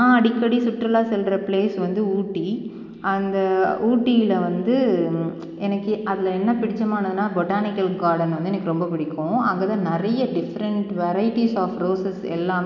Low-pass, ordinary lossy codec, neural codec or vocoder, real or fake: 7.2 kHz; none; none; real